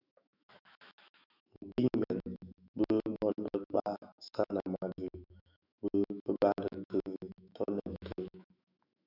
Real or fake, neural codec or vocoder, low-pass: fake; autoencoder, 48 kHz, 128 numbers a frame, DAC-VAE, trained on Japanese speech; 5.4 kHz